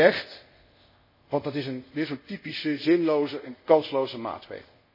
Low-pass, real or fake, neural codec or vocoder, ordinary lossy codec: 5.4 kHz; fake; codec, 24 kHz, 0.5 kbps, DualCodec; MP3, 24 kbps